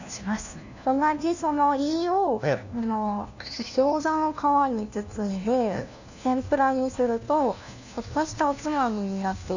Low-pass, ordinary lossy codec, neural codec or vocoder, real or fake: 7.2 kHz; none; codec, 16 kHz, 1 kbps, FunCodec, trained on LibriTTS, 50 frames a second; fake